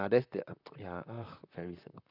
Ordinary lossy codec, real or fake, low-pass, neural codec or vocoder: none; fake; 5.4 kHz; vocoder, 44.1 kHz, 128 mel bands, Pupu-Vocoder